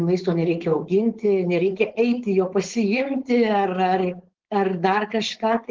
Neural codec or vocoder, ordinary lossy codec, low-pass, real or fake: codec, 16 kHz, 4.8 kbps, FACodec; Opus, 16 kbps; 7.2 kHz; fake